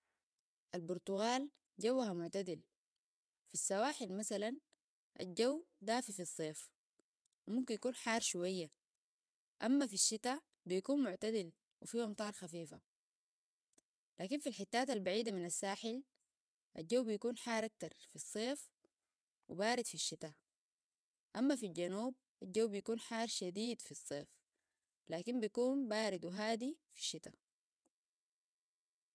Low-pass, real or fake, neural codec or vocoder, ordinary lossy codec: none; fake; vocoder, 22.05 kHz, 80 mel bands, WaveNeXt; none